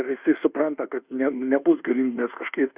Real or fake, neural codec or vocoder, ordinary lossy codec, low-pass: fake; codec, 24 kHz, 1.2 kbps, DualCodec; Opus, 64 kbps; 3.6 kHz